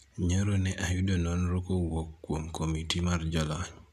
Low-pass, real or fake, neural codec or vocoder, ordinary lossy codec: 14.4 kHz; real; none; none